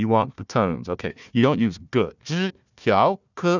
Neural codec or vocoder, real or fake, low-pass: codec, 16 kHz, 1 kbps, FunCodec, trained on Chinese and English, 50 frames a second; fake; 7.2 kHz